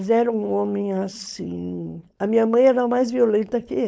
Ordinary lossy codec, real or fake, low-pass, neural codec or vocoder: none; fake; none; codec, 16 kHz, 4.8 kbps, FACodec